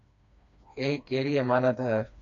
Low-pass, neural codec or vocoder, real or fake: 7.2 kHz; codec, 16 kHz, 2 kbps, FreqCodec, smaller model; fake